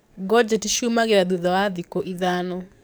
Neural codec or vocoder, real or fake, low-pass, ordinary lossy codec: codec, 44.1 kHz, 7.8 kbps, DAC; fake; none; none